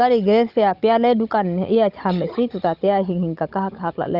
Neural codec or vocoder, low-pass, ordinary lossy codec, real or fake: codec, 16 kHz, 16 kbps, FunCodec, trained on Chinese and English, 50 frames a second; 5.4 kHz; Opus, 32 kbps; fake